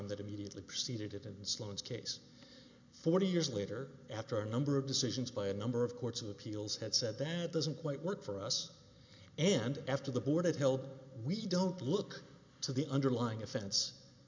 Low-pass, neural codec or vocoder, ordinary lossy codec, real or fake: 7.2 kHz; none; MP3, 64 kbps; real